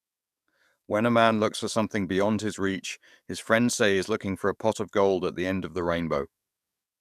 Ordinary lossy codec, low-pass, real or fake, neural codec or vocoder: none; 14.4 kHz; fake; codec, 44.1 kHz, 7.8 kbps, DAC